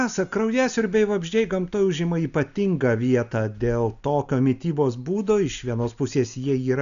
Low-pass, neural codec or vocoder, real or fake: 7.2 kHz; none; real